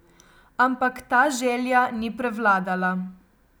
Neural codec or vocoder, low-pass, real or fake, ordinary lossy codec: none; none; real; none